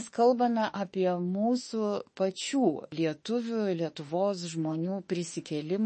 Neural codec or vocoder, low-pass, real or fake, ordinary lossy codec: autoencoder, 48 kHz, 32 numbers a frame, DAC-VAE, trained on Japanese speech; 10.8 kHz; fake; MP3, 32 kbps